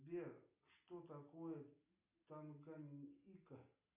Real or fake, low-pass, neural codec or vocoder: real; 3.6 kHz; none